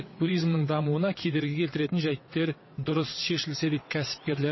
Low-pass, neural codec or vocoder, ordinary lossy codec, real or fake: 7.2 kHz; vocoder, 22.05 kHz, 80 mel bands, WaveNeXt; MP3, 24 kbps; fake